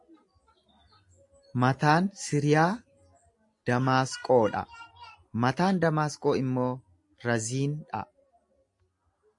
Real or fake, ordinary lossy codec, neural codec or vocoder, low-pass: real; AAC, 64 kbps; none; 10.8 kHz